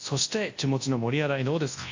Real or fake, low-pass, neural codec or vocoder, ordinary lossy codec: fake; 7.2 kHz; codec, 24 kHz, 0.9 kbps, WavTokenizer, large speech release; AAC, 48 kbps